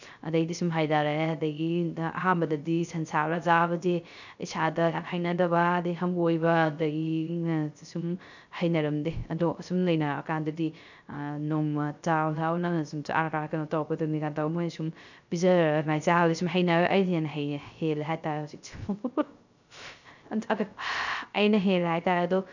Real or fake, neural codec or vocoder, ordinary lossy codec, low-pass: fake; codec, 16 kHz, 0.3 kbps, FocalCodec; none; 7.2 kHz